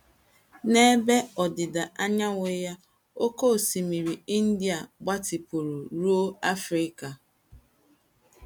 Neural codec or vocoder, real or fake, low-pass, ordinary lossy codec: none; real; none; none